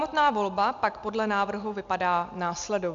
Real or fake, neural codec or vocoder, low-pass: real; none; 7.2 kHz